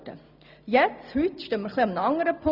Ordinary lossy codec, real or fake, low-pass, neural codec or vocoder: none; real; 5.4 kHz; none